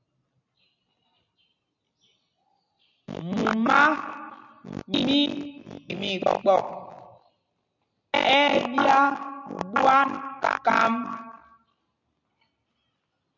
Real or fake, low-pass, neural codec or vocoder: real; 7.2 kHz; none